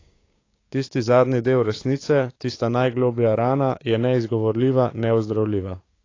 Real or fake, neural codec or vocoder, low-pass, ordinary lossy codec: fake; codec, 16 kHz, 6 kbps, DAC; 7.2 kHz; AAC, 32 kbps